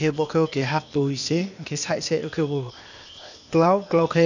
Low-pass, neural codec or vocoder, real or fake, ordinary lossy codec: 7.2 kHz; codec, 16 kHz, 0.8 kbps, ZipCodec; fake; none